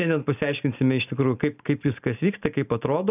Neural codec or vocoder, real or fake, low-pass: none; real; 3.6 kHz